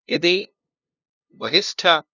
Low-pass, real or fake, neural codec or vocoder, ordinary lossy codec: 7.2 kHz; fake; codec, 16 kHz, 0.5 kbps, FunCodec, trained on LibriTTS, 25 frames a second; none